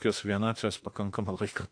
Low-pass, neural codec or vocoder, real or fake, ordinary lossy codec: 9.9 kHz; autoencoder, 48 kHz, 32 numbers a frame, DAC-VAE, trained on Japanese speech; fake; AAC, 48 kbps